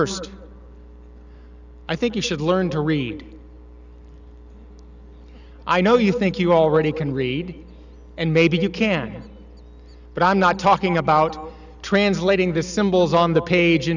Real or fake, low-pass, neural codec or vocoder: real; 7.2 kHz; none